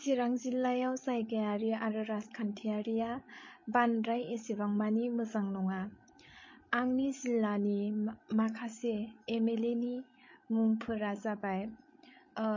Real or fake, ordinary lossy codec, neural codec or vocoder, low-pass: fake; MP3, 32 kbps; codec, 16 kHz, 16 kbps, FreqCodec, larger model; 7.2 kHz